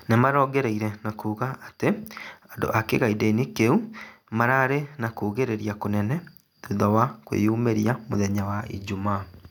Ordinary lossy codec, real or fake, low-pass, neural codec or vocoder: none; real; 19.8 kHz; none